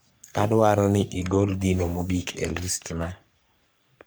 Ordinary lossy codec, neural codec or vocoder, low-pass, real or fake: none; codec, 44.1 kHz, 3.4 kbps, Pupu-Codec; none; fake